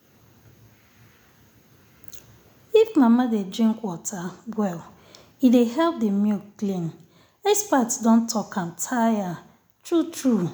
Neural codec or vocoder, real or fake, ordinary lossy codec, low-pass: none; real; none; none